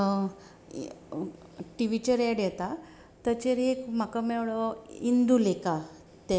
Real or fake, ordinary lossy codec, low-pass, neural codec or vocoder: real; none; none; none